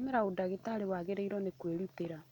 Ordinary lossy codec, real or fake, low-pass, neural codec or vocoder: none; real; 19.8 kHz; none